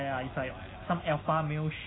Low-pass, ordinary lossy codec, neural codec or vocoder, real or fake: 7.2 kHz; AAC, 16 kbps; none; real